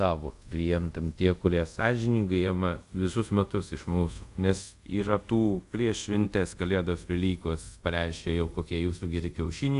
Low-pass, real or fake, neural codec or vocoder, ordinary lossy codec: 10.8 kHz; fake; codec, 24 kHz, 0.5 kbps, DualCodec; AAC, 96 kbps